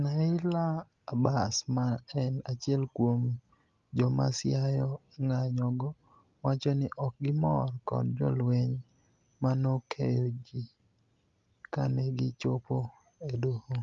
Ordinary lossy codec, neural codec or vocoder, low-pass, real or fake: Opus, 32 kbps; none; 7.2 kHz; real